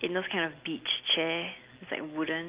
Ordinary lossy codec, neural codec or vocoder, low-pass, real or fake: Opus, 32 kbps; none; 3.6 kHz; real